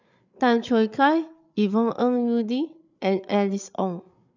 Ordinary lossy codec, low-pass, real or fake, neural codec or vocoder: none; 7.2 kHz; fake; codec, 16 kHz, 8 kbps, FreqCodec, larger model